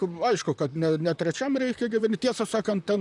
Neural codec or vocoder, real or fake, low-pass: vocoder, 44.1 kHz, 128 mel bands, Pupu-Vocoder; fake; 10.8 kHz